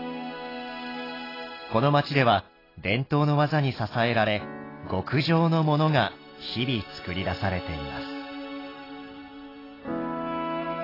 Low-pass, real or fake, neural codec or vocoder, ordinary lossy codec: 5.4 kHz; real; none; AAC, 24 kbps